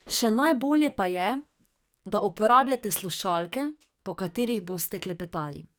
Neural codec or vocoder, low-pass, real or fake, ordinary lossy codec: codec, 44.1 kHz, 2.6 kbps, SNAC; none; fake; none